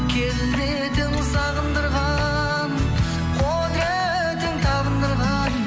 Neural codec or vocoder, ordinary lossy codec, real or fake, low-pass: none; none; real; none